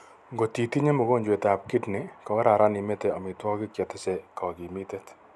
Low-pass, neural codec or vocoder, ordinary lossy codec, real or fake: none; none; none; real